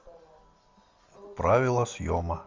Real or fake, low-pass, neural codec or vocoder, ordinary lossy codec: real; 7.2 kHz; none; Opus, 32 kbps